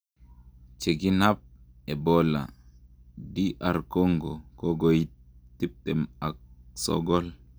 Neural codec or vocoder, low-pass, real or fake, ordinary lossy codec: none; none; real; none